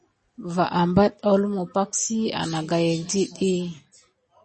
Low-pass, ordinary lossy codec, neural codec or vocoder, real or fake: 10.8 kHz; MP3, 32 kbps; none; real